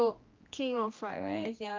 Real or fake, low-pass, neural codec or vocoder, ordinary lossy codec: fake; 7.2 kHz; codec, 16 kHz, 1 kbps, X-Codec, HuBERT features, trained on general audio; Opus, 24 kbps